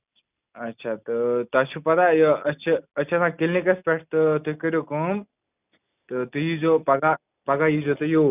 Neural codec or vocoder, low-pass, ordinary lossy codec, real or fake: none; 3.6 kHz; none; real